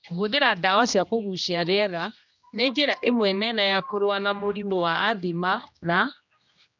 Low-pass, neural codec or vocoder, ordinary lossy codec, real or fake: 7.2 kHz; codec, 16 kHz, 1 kbps, X-Codec, HuBERT features, trained on general audio; none; fake